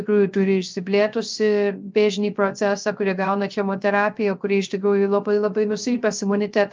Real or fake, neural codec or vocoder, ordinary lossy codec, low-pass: fake; codec, 16 kHz, 0.3 kbps, FocalCodec; Opus, 24 kbps; 7.2 kHz